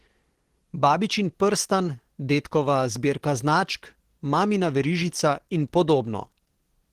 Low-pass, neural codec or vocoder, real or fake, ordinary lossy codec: 14.4 kHz; codec, 44.1 kHz, 7.8 kbps, DAC; fake; Opus, 16 kbps